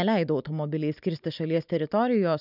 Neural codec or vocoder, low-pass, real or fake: none; 5.4 kHz; real